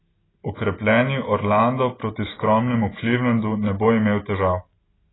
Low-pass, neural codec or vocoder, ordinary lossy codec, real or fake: 7.2 kHz; none; AAC, 16 kbps; real